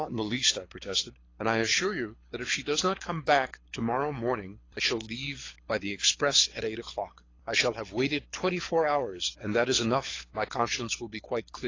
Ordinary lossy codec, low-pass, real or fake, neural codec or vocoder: AAC, 32 kbps; 7.2 kHz; fake; codec, 24 kHz, 6 kbps, HILCodec